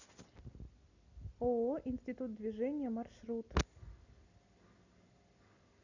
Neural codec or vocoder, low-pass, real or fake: none; 7.2 kHz; real